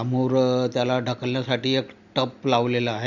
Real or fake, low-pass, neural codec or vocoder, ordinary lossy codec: real; 7.2 kHz; none; Opus, 64 kbps